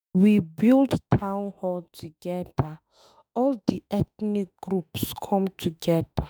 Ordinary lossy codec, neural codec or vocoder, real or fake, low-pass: none; autoencoder, 48 kHz, 32 numbers a frame, DAC-VAE, trained on Japanese speech; fake; none